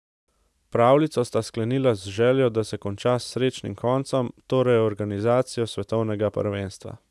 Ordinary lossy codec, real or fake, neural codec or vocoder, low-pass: none; real; none; none